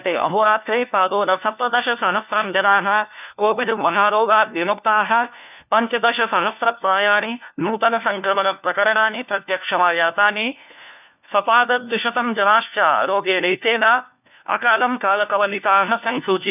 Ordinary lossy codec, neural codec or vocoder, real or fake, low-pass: none; codec, 16 kHz, 1 kbps, FunCodec, trained on LibriTTS, 50 frames a second; fake; 3.6 kHz